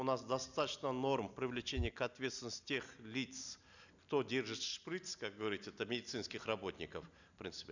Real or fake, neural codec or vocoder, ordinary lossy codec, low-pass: real; none; none; 7.2 kHz